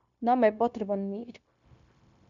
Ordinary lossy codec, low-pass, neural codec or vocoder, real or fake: MP3, 96 kbps; 7.2 kHz; codec, 16 kHz, 0.9 kbps, LongCat-Audio-Codec; fake